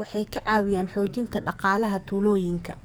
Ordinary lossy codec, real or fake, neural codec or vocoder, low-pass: none; fake; codec, 44.1 kHz, 2.6 kbps, SNAC; none